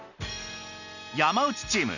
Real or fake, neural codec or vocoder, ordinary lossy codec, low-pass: real; none; none; 7.2 kHz